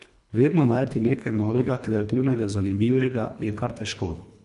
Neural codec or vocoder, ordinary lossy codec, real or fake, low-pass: codec, 24 kHz, 1.5 kbps, HILCodec; none; fake; 10.8 kHz